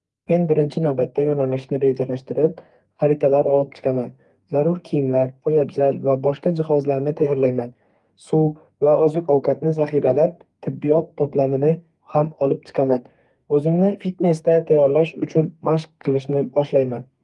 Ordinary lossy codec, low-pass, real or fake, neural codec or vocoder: Opus, 32 kbps; 10.8 kHz; fake; codec, 32 kHz, 1.9 kbps, SNAC